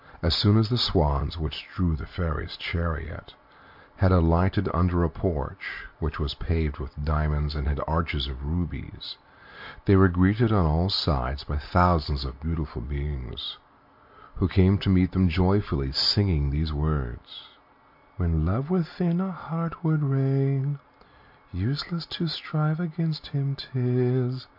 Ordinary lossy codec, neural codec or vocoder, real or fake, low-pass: MP3, 48 kbps; none; real; 5.4 kHz